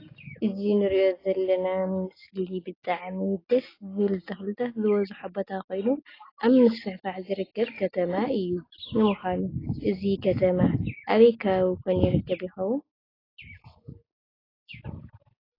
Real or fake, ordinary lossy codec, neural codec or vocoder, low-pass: real; AAC, 24 kbps; none; 5.4 kHz